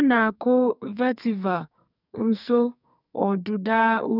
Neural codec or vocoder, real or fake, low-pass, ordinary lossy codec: codec, 44.1 kHz, 2.6 kbps, SNAC; fake; 5.4 kHz; none